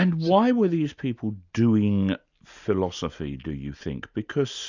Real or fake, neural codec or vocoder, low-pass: real; none; 7.2 kHz